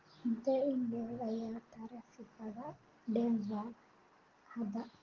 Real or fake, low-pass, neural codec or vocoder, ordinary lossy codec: real; 7.2 kHz; none; Opus, 16 kbps